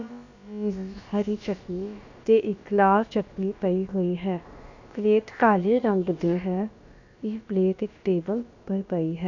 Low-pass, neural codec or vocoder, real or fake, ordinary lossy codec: 7.2 kHz; codec, 16 kHz, about 1 kbps, DyCAST, with the encoder's durations; fake; none